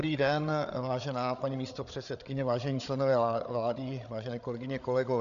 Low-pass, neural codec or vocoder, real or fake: 7.2 kHz; codec, 16 kHz, 8 kbps, FreqCodec, larger model; fake